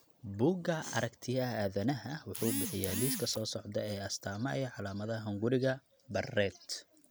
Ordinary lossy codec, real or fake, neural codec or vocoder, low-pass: none; real; none; none